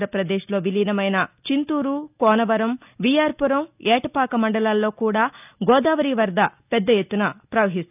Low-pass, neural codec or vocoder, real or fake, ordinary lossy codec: 3.6 kHz; none; real; none